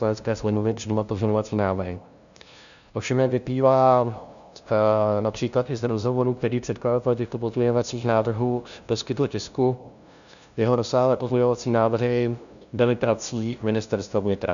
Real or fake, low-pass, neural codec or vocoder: fake; 7.2 kHz; codec, 16 kHz, 0.5 kbps, FunCodec, trained on LibriTTS, 25 frames a second